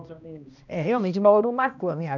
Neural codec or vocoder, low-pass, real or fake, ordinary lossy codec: codec, 16 kHz, 1 kbps, X-Codec, HuBERT features, trained on balanced general audio; 7.2 kHz; fake; none